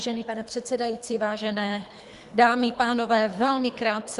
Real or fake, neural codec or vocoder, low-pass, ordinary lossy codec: fake; codec, 24 kHz, 3 kbps, HILCodec; 10.8 kHz; Opus, 64 kbps